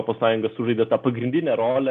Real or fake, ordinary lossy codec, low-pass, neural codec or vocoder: real; MP3, 64 kbps; 14.4 kHz; none